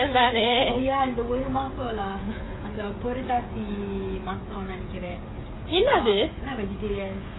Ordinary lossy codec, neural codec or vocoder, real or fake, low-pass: AAC, 16 kbps; codec, 16 kHz, 16 kbps, FreqCodec, smaller model; fake; 7.2 kHz